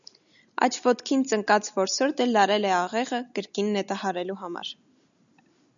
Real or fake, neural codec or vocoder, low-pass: real; none; 7.2 kHz